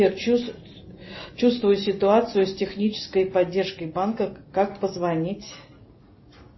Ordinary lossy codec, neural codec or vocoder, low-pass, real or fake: MP3, 24 kbps; none; 7.2 kHz; real